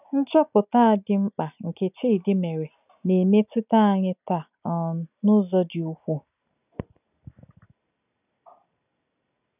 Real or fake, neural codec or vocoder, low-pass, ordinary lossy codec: real; none; 3.6 kHz; none